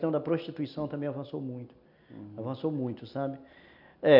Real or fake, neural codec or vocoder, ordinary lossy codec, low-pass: real; none; none; 5.4 kHz